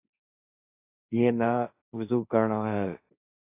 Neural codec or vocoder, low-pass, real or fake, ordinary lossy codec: codec, 16 kHz, 1.1 kbps, Voila-Tokenizer; 3.6 kHz; fake; AAC, 24 kbps